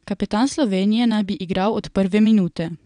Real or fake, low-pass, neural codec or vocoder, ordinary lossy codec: fake; 9.9 kHz; vocoder, 22.05 kHz, 80 mel bands, WaveNeXt; none